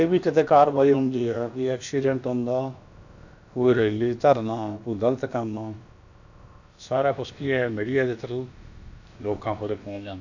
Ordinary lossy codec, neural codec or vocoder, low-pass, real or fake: none; codec, 16 kHz, about 1 kbps, DyCAST, with the encoder's durations; 7.2 kHz; fake